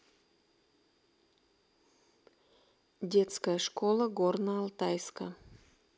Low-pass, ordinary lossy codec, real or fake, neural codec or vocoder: none; none; real; none